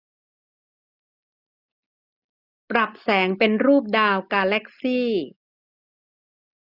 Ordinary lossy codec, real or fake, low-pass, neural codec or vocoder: none; real; 5.4 kHz; none